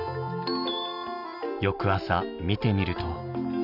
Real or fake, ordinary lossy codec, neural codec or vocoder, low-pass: real; none; none; 5.4 kHz